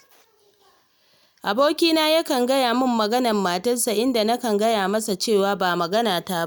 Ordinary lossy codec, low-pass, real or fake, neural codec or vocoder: none; none; real; none